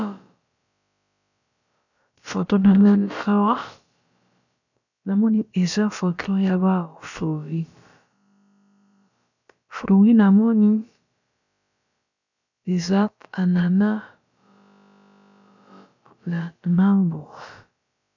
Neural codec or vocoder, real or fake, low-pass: codec, 16 kHz, about 1 kbps, DyCAST, with the encoder's durations; fake; 7.2 kHz